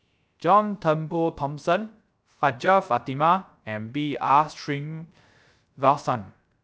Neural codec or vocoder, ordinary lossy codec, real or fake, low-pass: codec, 16 kHz, 0.3 kbps, FocalCodec; none; fake; none